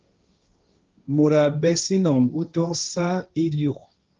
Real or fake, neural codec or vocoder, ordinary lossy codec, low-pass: fake; codec, 16 kHz, 1.1 kbps, Voila-Tokenizer; Opus, 24 kbps; 7.2 kHz